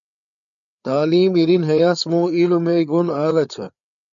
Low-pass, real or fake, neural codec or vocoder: 7.2 kHz; fake; codec, 16 kHz, 4 kbps, FreqCodec, larger model